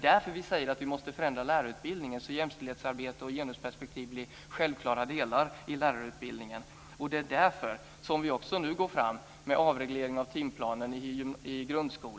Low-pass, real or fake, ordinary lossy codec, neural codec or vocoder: none; real; none; none